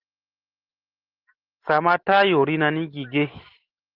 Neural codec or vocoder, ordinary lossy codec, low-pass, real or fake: none; Opus, 16 kbps; 5.4 kHz; real